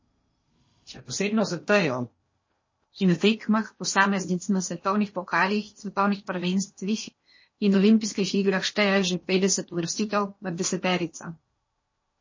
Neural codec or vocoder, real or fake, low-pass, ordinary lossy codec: codec, 16 kHz in and 24 kHz out, 0.8 kbps, FocalCodec, streaming, 65536 codes; fake; 7.2 kHz; MP3, 32 kbps